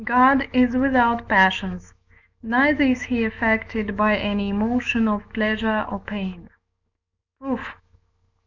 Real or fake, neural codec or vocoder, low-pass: fake; autoencoder, 48 kHz, 128 numbers a frame, DAC-VAE, trained on Japanese speech; 7.2 kHz